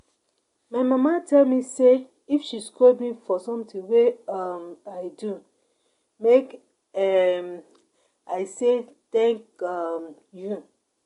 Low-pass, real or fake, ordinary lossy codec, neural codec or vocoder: 10.8 kHz; real; AAC, 48 kbps; none